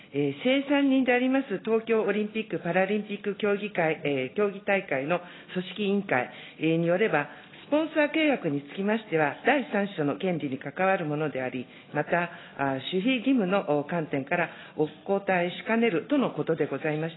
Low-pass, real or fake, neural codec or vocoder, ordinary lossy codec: 7.2 kHz; real; none; AAC, 16 kbps